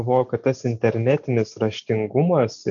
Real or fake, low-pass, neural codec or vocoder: real; 7.2 kHz; none